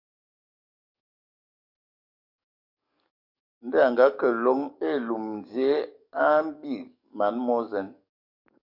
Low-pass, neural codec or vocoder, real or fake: 5.4 kHz; codec, 44.1 kHz, 7.8 kbps, DAC; fake